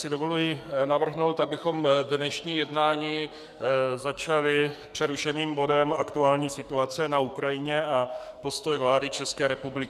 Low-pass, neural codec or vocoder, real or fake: 14.4 kHz; codec, 44.1 kHz, 2.6 kbps, SNAC; fake